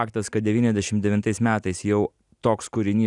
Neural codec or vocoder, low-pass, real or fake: none; 10.8 kHz; real